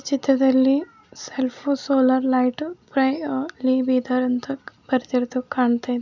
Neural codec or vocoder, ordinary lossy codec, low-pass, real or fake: none; none; 7.2 kHz; real